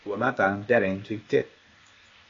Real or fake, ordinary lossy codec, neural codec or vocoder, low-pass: fake; AAC, 32 kbps; codec, 16 kHz, 0.8 kbps, ZipCodec; 7.2 kHz